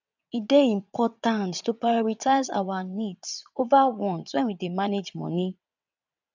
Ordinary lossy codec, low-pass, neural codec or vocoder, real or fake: none; 7.2 kHz; vocoder, 22.05 kHz, 80 mel bands, WaveNeXt; fake